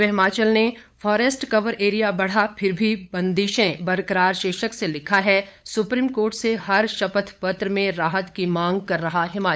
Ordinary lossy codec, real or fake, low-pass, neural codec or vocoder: none; fake; none; codec, 16 kHz, 8 kbps, FunCodec, trained on LibriTTS, 25 frames a second